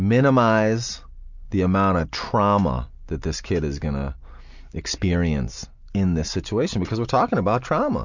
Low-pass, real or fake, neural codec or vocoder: 7.2 kHz; real; none